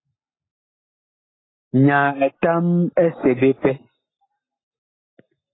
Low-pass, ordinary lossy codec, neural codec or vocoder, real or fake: 7.2 kHz; AAC, 16 kbps; none; real